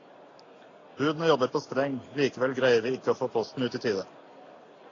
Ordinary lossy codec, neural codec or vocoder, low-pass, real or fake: AAC, 32 kbps; none; 7.2 kHz; real